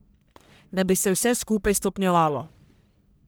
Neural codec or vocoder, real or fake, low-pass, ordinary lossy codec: codec, 44.1 kHz, 1.7 kbps, Pupu-Codec; fake; none; none